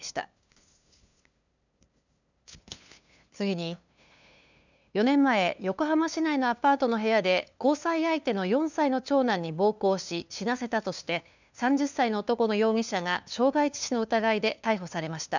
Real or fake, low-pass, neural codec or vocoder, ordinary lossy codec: fake; 7.2 kHz; codec, 16 kHz, 2 kbps, FunCodec, trained on LibriTTS, 25 frames a second; none